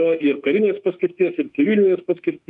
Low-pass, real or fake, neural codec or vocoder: 10.8 kHz; fake; autoencoder, 48 kHz, 32 numbers a frame, DAC-VAE, trained on Japanese speech